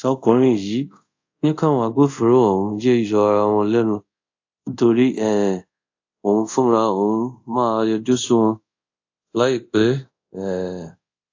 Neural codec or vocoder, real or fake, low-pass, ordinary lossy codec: codec, 24 kHz, 0.5 kbps, DualCodec; fake; 7.2 kHz; AAC, 48 kbps